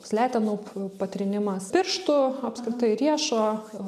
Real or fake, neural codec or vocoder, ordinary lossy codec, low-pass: real; none; MP3, 96 kbps; 14.4 kHz